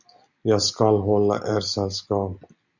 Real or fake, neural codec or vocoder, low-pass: real; none; 7.2 kHz